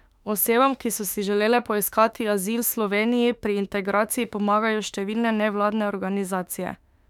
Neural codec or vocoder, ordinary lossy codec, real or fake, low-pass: autoencoder, 48 kHz, 32 numbers a frame, DAC-VAE, trained on Japanese speech; none; fake; 19.8 kHz